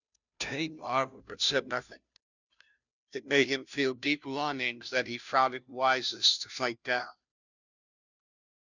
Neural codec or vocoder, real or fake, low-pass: codec, 16 kHz, 0.5 kbps, FunCodec, trained on Chinese and English, 25 frames a second; fake; 7.2 kHz